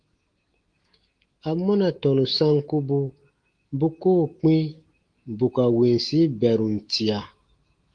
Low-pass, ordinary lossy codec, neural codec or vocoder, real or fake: 9.9 kHz; Opus, 24 kbps; autoencoder, 48 kHz, 128 numbers a frame, DAC-VAE, trained on Japanese speech; fake